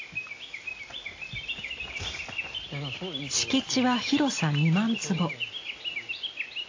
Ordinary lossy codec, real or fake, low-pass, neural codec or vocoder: AAC, 32 kbps; real; 7.2 kHz; none